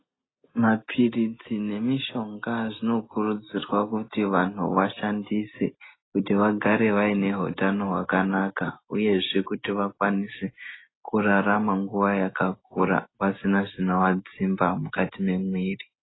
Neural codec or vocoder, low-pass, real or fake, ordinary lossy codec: none; 7.2 kHz; real; AAC, 16 kbps